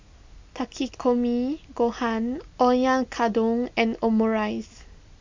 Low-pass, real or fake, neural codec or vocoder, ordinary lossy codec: 7.2 kHz; real; none; MP3, 64 kbps